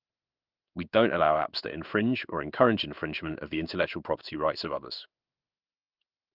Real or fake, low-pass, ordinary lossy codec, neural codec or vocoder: fake; 5.4 kHz; Opus, 32 kbps; codec, 16 kHz in and 24 kHz out, 1 kbps, XY-Tokenizer